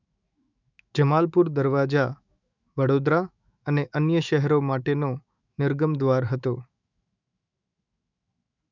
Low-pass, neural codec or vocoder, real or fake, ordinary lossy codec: 7.2 kHz; autoencoder, 48 kHz, 128 numbers a frame, DAC-VAE, trained on Japanese speech; fake; none